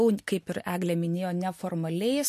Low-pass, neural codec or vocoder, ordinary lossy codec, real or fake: 14.4 kHz; none; MP3, 64 kbps; real